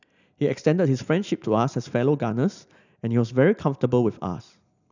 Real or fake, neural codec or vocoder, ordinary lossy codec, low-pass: real; none; none; 7.2 kHz